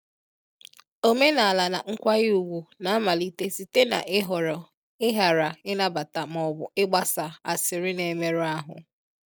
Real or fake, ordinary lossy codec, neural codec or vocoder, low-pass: real; none; none; none